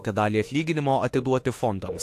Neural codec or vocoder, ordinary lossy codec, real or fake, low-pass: autoencoder, 48 kHz, 32 numbers a frame, DAC-VAE, trained on Japanese speech; AAC, 64 kbps; fake; 14.4 kHz